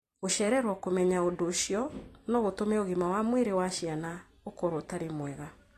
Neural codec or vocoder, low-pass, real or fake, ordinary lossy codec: vocoder, 44.1 kHz, 128 mel bands every 256 samples, BigVGAN v2; 14.4 kHz; fake; AAC, 48 kbps